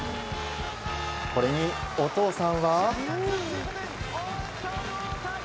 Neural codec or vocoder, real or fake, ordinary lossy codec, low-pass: none; real; none; none